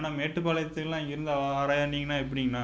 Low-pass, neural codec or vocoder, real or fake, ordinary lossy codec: none; none; real; none